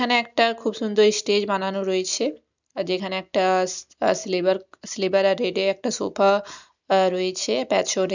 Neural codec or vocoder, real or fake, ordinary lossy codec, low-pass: none; real; none; 7.2 kHz